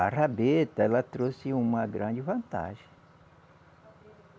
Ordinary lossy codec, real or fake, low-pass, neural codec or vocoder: none; real; none; none